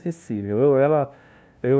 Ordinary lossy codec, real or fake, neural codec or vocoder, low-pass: none; fake; codec, 16 kHz, 0.5 kbps, FunCodec, trained on LibriTTS, 25 frames a second; none